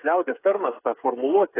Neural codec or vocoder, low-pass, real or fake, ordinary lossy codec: codec, 16 kHz, 8 kbps, FreqCodec, smaller model; 3.6 kHz; fake; AAC, 16 kbps